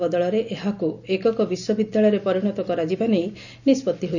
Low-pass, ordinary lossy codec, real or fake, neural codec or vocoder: 7.2 kHz; none; real; none